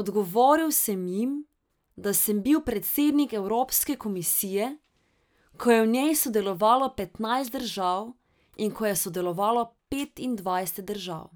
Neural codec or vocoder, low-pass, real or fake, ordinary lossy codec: none; none; real; none